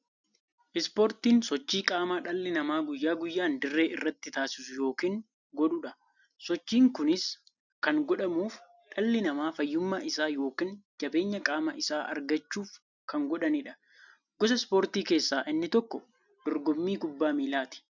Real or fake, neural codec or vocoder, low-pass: real; none; 7.2 kHz